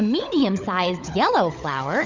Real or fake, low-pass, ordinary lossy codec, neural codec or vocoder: fake; 7.2 kHz; Opus, 64 kbps; codec, 16 kHz, 16 kbps, FunCodec, trained on LibriTTS, 50 frames a second